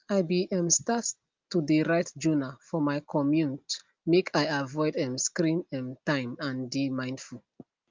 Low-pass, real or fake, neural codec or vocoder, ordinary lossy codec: 7.2 kHz; real; none; Opus, 32 kbps